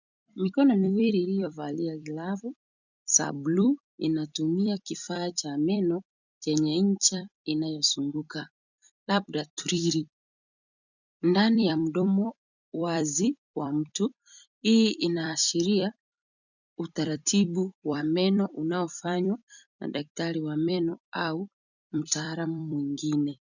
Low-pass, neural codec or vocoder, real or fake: 7.2 kHz; vocoder, 44.1 kHz, 128 mel bands every 256 samples, BigVGAN v2; fake